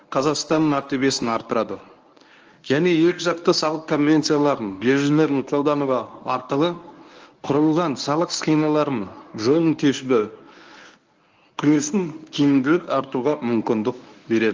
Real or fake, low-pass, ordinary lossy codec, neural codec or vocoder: fake; 7.2 kHz; Opus, 24 kbps; codec, 24 kHz, 0.9 kbps, WavTokenizer, medium speech release version 1